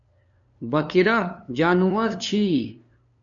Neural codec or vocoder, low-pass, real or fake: codec, 16 kHz, 2 kbps, FunCodec, trained on LibriTTS, 25 frames a second; 7.2 kHz; fake